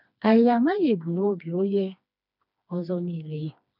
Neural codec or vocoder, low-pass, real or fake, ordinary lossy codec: codec, 16 kHz, 2 kbps, FreqCodec, smaller model; 5.4 kHz; fake; none